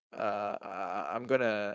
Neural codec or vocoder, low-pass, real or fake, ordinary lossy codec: codec, 16 kHz, 4.8 kbps, FACodec; none; fake; none